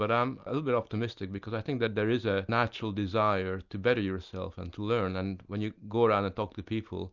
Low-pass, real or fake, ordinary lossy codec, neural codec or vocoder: 7.2 kHz; real; Opus, 64 kbps; none